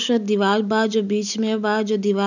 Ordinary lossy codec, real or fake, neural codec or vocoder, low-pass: none; real; none; 7.2 kHz